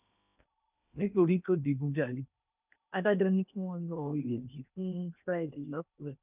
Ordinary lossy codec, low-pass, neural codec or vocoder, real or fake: none; 3.6 kHz; codec, 16 kHz in and 24 kHz out, 0.8 kbps, FocalCodec, streaming, 65536 codes; fake